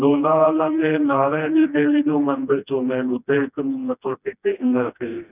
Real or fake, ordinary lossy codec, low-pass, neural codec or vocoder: fake; none; 3.6 kHz; codec, 16 kHz, 1 kbps, FreqCodec, smaller model